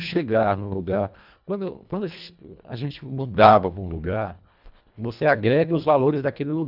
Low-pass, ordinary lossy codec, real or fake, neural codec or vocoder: 5.4 kHz; none; fake; codec, 24 kHz, 1.5 kbps, HILCodec